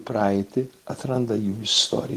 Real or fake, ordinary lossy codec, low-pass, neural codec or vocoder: real; Opus, 16 kbps; 14.4 kHz; none